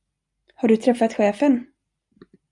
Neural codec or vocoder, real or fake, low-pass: none; real; 10.8 kHz